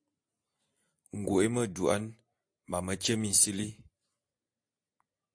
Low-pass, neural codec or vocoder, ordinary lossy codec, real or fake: 9.9 kHz; none; AAC, 64 kbps; real